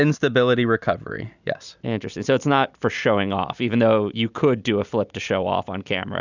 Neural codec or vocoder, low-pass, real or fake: none; 7.2 kHz; real